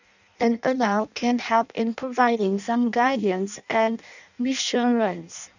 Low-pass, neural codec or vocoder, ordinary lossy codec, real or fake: 7.2 kHz; codec, 16 kHz in and 24 kHz out, 0.6 kbps, FireRedTTS-2 codec; none; fake